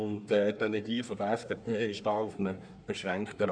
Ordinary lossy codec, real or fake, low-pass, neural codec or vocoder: none; fake; 9.9 kHz; codec, 24 kHz, 1 kbps, SNAC